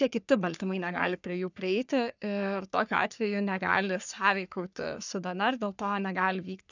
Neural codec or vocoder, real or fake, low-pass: codec, 44.1 kHz, 3.4 kbps, Pupu-Codec; fake; 7.2 kHz